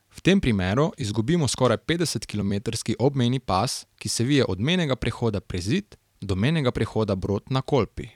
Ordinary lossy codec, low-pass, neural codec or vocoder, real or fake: none; 19.8 kHz; vocoder, 44.1 kHz, 128 mel bands every 256 samples, BigVGAN v2; fake